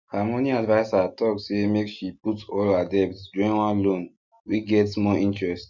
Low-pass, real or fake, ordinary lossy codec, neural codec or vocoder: 7.2 kHz; real; none; none